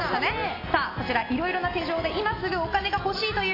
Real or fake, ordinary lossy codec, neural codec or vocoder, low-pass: real; AAC, 24 kbps; none; 5.4 kHz